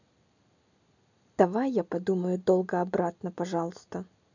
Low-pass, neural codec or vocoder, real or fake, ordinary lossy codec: 7.2 kHz; none; real; none